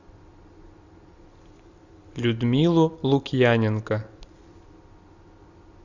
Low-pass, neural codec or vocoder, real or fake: 7.2 kHz; none; real